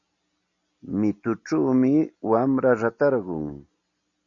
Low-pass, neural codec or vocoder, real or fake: 7.2 kHz; none; real